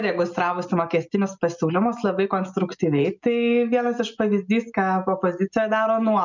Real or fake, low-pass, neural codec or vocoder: real; 7.2 kHz; none